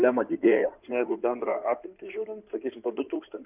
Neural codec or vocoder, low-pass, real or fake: codec, 16 kHz in and 24 kHz out, 2.2 kbps, FireRedTTS-2 codec; 3.6 kHz; fake